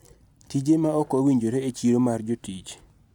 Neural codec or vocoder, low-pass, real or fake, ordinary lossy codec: none; 19.8 kHz; real; none